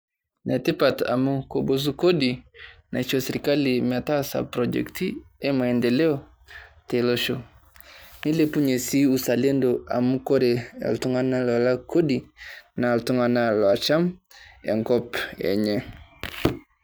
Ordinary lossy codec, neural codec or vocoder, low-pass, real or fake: none; none; none; real